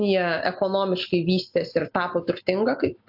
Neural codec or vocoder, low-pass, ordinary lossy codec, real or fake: none; 5.4 kHz; AAC, 48 kbps; real